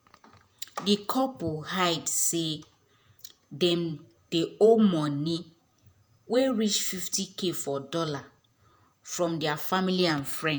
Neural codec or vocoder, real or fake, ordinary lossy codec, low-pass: vocoder, 48 kHz, 128 mel bands, Vocos; fake; none; none